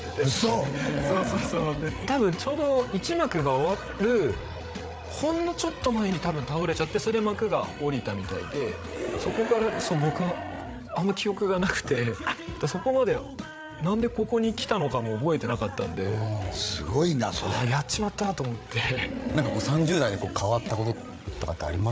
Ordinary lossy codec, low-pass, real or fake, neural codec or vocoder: none; none; fake; codec, 16 kHz, 8 kbps, FreqCodec, larger model